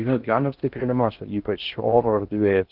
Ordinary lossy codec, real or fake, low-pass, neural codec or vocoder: Opus, 32 kbps; fake; 5.4 kHz; codec, 16 kHz in and 24 kHz out, 0.6 kbps, FocalCodec, streaming, 2048 codes